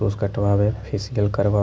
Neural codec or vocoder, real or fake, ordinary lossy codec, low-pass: none; real; none; none